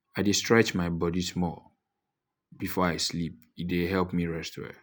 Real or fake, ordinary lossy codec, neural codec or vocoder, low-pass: real; none; none; none